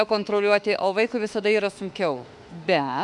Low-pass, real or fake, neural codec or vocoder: 10.8 kHz; fake; autoencoder, 48 kHz, 32 numbers a frame, DAC-VAE, trained on Japanese speech